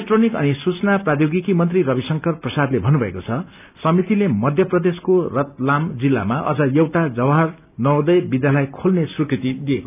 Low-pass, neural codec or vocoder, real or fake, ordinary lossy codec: 3.6 kHz; none; real; none